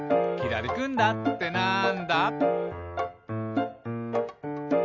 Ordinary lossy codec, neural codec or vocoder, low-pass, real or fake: none; none; 7.2 kHz; real